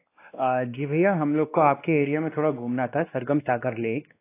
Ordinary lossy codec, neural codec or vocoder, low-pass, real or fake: AAC, 24 kbps; codec, 16 kHz, 2 kbps, X-Codec, WavLM features, trained on Multilingual LibriSpeech; 3.6 kHz; fake